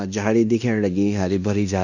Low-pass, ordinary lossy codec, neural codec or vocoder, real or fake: 7.2 kHz; none; codec, 16 kHz in and 24 kHz out, 0.9 kbps, LongCat-Audio-Codec, fine tuned four codebook decoder; fake